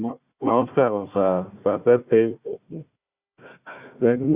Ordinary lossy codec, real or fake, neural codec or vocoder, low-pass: Opus, 64 kbps; fake; codec, 16 kHz, 1 kbps, FunCodec, trained on Chinese and English, 50 frames a second; 3.6 kHz